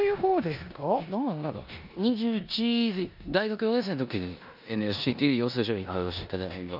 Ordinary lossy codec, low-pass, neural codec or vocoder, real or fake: none; 5.4 kHz; codec, 16 kHz in and 24 kHz out, 0.9 kbps, LongCat-Audio-Codec, four codebook decoder; fake